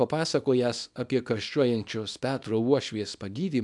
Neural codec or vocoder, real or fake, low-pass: codec, 24 kHz, 0.9 kbps, WavTokenizer, small release; fake; 10.8 kHz